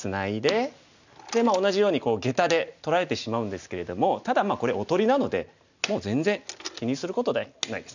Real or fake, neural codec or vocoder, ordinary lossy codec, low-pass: real; none; none; 7.2 kHz